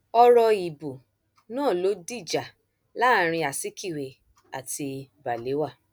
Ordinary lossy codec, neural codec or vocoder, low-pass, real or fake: none; none; none; real